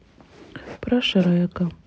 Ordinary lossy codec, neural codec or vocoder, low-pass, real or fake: none; none; none; real